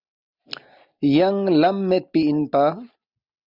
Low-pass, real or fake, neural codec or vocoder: 5.4 kHz; real; none